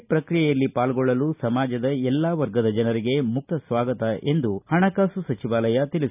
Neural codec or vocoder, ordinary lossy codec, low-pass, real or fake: none; none; 3.6 kHz; real